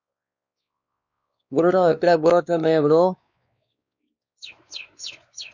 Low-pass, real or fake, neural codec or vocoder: 7.2 kHz; fake; codec, 16 kHz, 2 kbps, X-Codec, WavLM features, trained on Multilingual LibriSpeech